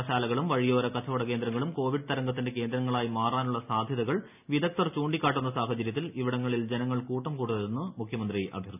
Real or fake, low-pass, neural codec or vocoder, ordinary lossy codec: real; 3.6 kHz; none; none